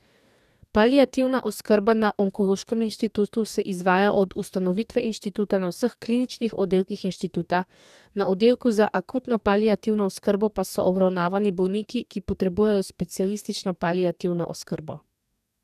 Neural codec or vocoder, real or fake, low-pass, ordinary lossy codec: codec, 44.1 kHz, 2.6 kbps, DAC; fake; 14.4 kHz; none